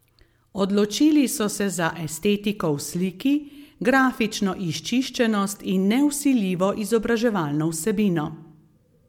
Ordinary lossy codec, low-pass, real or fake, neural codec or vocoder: MP3, 96 kbps; 19.8 kHz; real; none